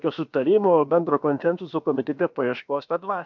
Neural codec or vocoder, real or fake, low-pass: codec, 16 kHz, about 1 kbps, DyCAST, with the encoder's durations; fake; 7.2 kHz